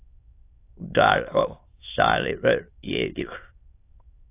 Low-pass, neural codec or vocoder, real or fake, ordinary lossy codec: 3.6 kHz; autoencoder, 22.05 kHz, a latent of 192 numbers a frame, VITS, trained on many speakers; fake; AAC, 24 kbps